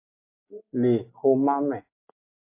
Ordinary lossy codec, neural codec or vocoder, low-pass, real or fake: MP3, 24 kbps; none; 3.6 kHz; real